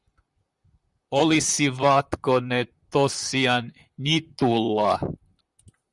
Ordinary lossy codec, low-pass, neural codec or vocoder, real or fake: Opus, 64 kbps; 10.8 kHz; vocoder, 44.1 kHz, 128 mel bands, Pupu-Vocoder; fake